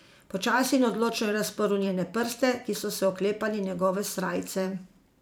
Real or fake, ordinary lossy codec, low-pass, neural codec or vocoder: fake; none; none; vocoder, 44.1 kHz, 128 mel bands every 512 samples, BigVGAN v2